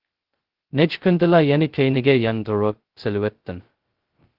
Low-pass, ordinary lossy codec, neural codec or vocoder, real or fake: 5.4 kHz; Opus, 16 kbps; codec, 16 kHz, 0.2 kbps, FocalCodec; fake